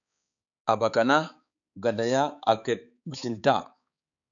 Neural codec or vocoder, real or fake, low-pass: codec, 16 kHz, 4 kbps, X-Codec, HuBERT features, trained on balanced general audio; fake; 7.2 kHz